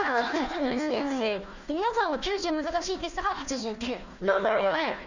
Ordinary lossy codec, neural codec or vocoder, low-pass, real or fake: none; codec, 16 kHz, 1 kbps, FunCodec, trained on Chinese and English, 50 frames a second; 7.2 kHz; fake